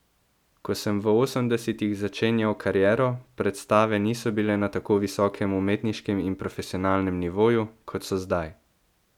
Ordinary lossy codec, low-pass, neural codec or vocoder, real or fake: none; 19.8 kHz; none; real